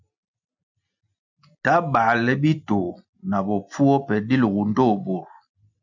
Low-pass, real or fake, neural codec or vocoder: 7.2 kHz; real; none